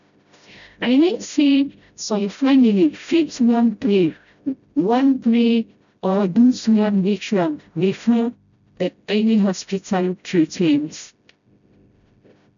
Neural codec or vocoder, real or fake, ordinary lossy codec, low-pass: codec, 16 kHz, 0.5 kbps, FreqCodec, smaller model; fake; none; 7.2 kHz